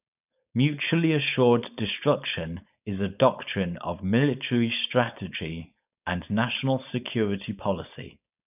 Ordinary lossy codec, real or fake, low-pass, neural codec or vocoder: none; fake; 3.6 kHz; codec, 16 kHz, 4.8 kbps, FACodec